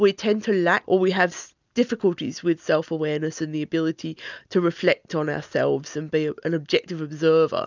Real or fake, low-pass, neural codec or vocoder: real; 7.2 kHz; none